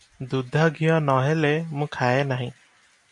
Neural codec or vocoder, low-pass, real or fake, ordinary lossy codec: none; 10.8 kHz; real; MP3, 64 kbps